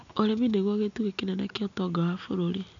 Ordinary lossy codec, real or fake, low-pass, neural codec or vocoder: none; real; 7.2 kHz; none